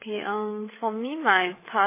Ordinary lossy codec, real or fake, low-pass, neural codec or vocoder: MP3, 16 kbps; fake; 3.6 kHz; codec, 16 kHz, 8 kbps, FreqCodec, larger model